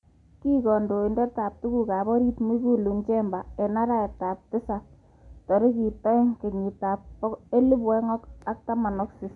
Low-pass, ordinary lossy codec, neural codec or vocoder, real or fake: 10.8 kHz; none; none; real